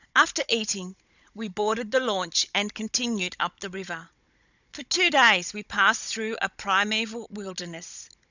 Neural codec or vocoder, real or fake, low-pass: codec, 16 kHz, 16 kbps, FunCodec, trained on Chinese and English, 50 frames a second; fake; 7.2 kHz